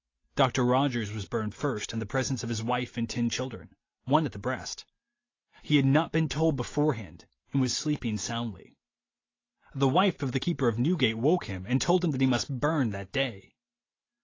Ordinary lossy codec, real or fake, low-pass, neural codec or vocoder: AAC, 32 kbps; real; 7.2 kHz; none